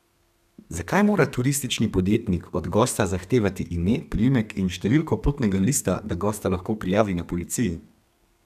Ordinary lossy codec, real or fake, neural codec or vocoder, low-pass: none; fake; codec, 32 kHz, 1.9 kbps, SNAC; 14.4 kHz